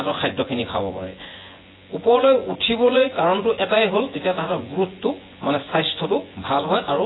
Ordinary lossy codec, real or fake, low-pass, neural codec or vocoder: AAC, 16 kbps; fake; 7.2 kHz; vocoder, 24 kHz, 100 mel bands, Vocos